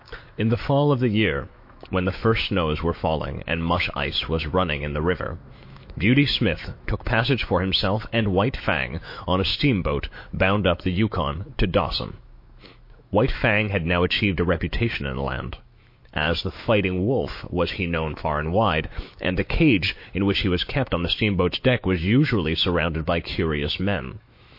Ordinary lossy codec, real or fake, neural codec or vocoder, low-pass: MP3, 32 kbps; fake; autoencoder, 48 kHz, 128 numbers a frame, DAC-VAE, trained on Japanese speech; 5.4 kHz